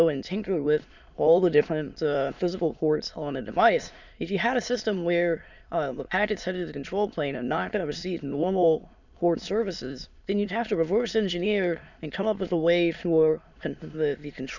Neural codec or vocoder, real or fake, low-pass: autoencoder, 22.05 kHz, a latent of 192 numbers a frame, VITS, trained on many speakers; fake; 7.2 kHz